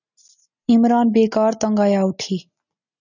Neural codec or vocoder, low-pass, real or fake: none; 7.2 kHz; real